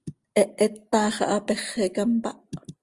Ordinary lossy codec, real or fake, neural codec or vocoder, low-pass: Opus, 32 kbps; real; none; 10.8 kHz